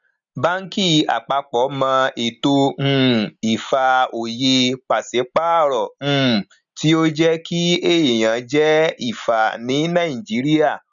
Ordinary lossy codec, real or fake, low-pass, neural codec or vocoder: none; real; 7.2 kHz; none